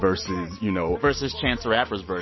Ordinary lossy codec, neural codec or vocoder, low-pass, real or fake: MP3, 24 kbps; none; 7.2 kHz; real